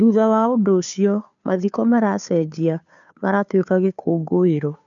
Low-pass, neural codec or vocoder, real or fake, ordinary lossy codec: 7.2 kHz; codec, 16 kHz, 2 kbps, FunCodec, trained on Chinese and English, 25 frames a second; fake; none